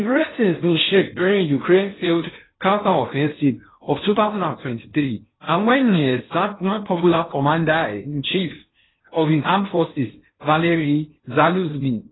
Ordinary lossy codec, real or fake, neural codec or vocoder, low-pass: AAC, 16 kbps; fake; codec, 16 kHz in and 24 kHz out, 0.6 kbps, FocalCodec, streaming, 4096 codes; 7.2 kHz